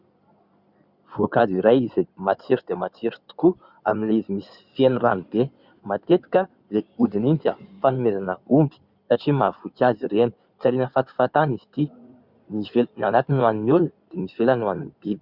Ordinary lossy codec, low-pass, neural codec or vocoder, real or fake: Opus, 64 kbps; 5.4 kHz; codec, 16 kHz in and 24 kHz out, 2.2 kbps, FireRedTTS-2 codec; fake